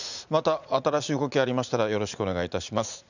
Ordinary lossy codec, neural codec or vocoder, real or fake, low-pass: none; vocoder, 44.1 kHz, 80 mel bands, Vocos; fake; 7.2 kHz